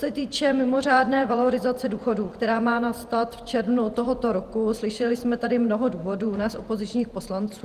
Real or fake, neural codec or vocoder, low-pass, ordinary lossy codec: fake; vocoder, 48 kHz, 128 mel bands, Vocos; 14.4 kHz; Opus, 24 kbps